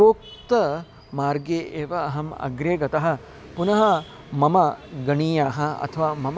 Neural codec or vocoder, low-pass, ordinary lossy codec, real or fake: none; none; none; real